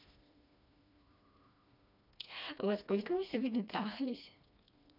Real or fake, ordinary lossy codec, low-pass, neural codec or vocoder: fake; none; 5.4 kHz; codec, 16 kHz, 2 kbps, FreqCodec, smaller model